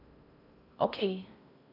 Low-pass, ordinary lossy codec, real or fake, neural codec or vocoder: 5.4 kHz; none; fake; codec, 16 kHz in and 24 kHz out, 0.6 kbps, FocalCodec, streaming, 4096 codes